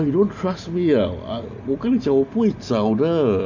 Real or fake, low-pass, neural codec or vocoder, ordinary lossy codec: real; 7.2 kHz; none; none